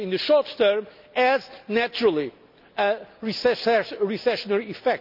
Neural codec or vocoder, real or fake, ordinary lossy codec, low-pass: none; real; none; 5.4 kHz